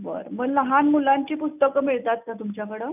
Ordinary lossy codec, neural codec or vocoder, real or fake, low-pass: none; none; real; 3.6 kHz